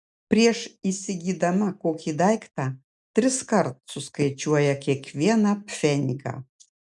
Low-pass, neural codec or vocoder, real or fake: 10.8 kHz; none; real